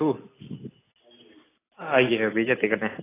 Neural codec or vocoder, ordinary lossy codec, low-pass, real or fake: none; AAC, 16 kbps; 3.6 kHz; real